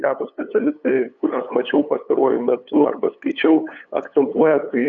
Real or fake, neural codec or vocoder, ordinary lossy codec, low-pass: fake; codec, 16 kHz, 8 kbps, FunCodec, trained on LibriTTS, 25 frames a second; MP3, 96 kbps; 7.2 kHz